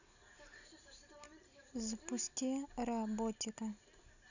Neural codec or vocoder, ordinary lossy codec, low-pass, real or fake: none; none; 7.2 kHz; real